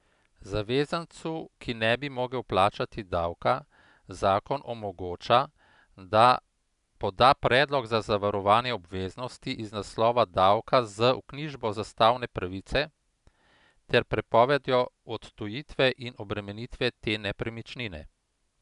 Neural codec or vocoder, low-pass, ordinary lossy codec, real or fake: none; 10.8 kHz; none; real